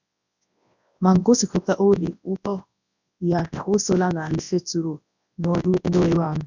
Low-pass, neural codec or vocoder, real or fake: 7.2 kHz; codec, 24 kHz, 0.9 kbps, WavTokenizer, large speech release; fake